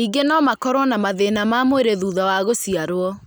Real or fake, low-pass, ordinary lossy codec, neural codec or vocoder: real; none; none; none